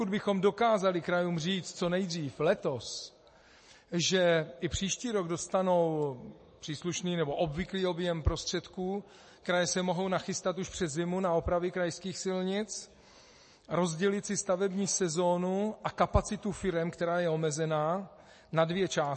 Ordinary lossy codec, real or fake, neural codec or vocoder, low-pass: MP3, 32 kbps; real; none; 10.8 kHz